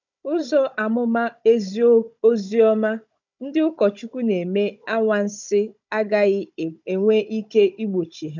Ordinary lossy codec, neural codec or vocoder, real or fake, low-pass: MP3, 64 kbps; codec, 16 kHz, 16 kbps, FunCodec, trained on Chinese and English, 50 frames a second; fake; 7.2 kHz